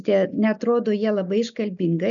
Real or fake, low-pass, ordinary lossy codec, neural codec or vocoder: real; 7.2 kHz; MP3, 96 kbps; none